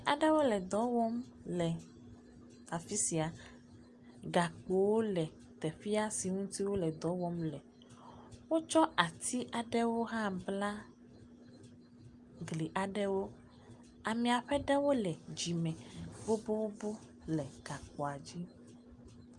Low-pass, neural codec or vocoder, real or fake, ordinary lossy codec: 10.8 kHz; none; real; Opus, 32 kbps